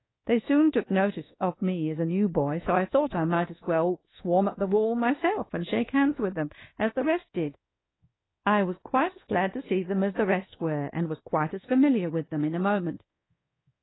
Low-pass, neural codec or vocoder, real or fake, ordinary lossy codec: 7.2 kHz; codec, 24 kHz, 1.2 kbps, DualCodec; fake; AAC, 16 kbps